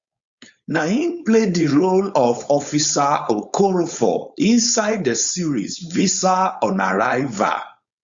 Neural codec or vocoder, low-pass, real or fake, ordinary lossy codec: codec, 16 kHz, 4.8 kbps, FACodec; 7.2 kHz; fake; Opus, 64 kbps